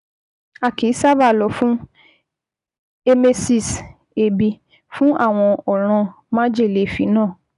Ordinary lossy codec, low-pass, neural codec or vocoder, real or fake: none; 10.8 kHz; none; real